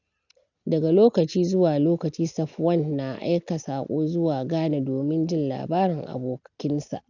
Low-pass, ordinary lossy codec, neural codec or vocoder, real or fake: 7.2 kHz; none; none; real